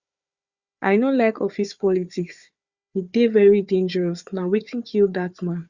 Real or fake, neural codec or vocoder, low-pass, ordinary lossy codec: fake; codec, 16 kHz, 4 kbps, FunCodec, trained on Chinese and English, 50 frames a second; 7.2 kHz; Opus, 64 kbps